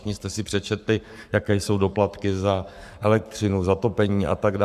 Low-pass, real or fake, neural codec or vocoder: 14.4 kHz; fake; codec, 44.1 kHz, 7.8 kbps, Pupu-Codec